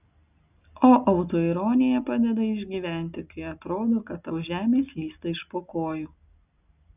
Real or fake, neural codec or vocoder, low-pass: real; none; 3.6 kHz